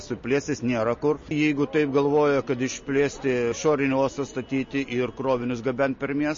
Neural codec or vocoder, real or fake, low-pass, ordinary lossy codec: none; real; 7.2 kHz; MP3, 32 kbps